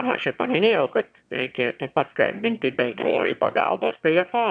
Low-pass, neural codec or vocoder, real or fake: 9.9 kHz; autoencoder, 22.05 kHz, a latent of 192 numbers a frame, VITS, trained on one speaker; fake